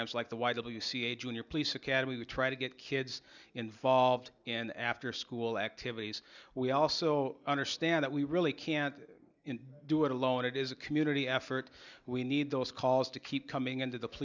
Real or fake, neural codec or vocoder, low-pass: real; none; 7.2 kHz